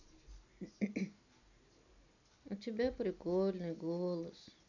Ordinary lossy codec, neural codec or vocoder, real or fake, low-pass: none; none; real; 7.2 kHz